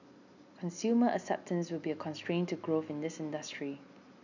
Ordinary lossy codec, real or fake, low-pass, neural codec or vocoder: none; real; 7.2 kHz; none